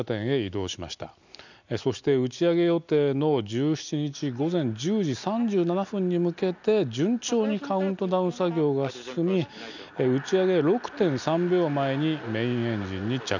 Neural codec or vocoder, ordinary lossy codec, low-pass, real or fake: none; none; 7.2 kHz; real